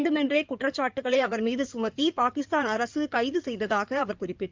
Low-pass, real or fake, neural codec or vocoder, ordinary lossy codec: 7.2 kHz; fake; codec, 16 kHz in and 24 kHz out, 2.2 kbps, FireRedTTS-2 codec; Opus, 24 kbps